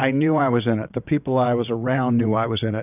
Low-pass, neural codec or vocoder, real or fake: 3.6 kHz; vocoder, 22.05 kHz, 80 mel bands, WaveNeXt; fake